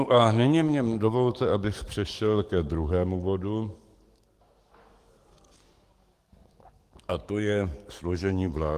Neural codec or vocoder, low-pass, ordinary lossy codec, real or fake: codec, 44.1 kHz, 7.8 kbps, DAC; 14.4 kHz; Opus, 24 kbps; fake